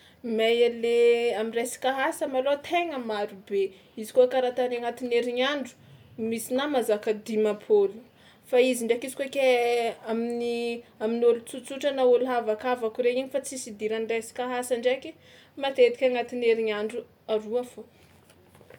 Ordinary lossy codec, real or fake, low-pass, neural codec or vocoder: none; real; 19.8 kHz; none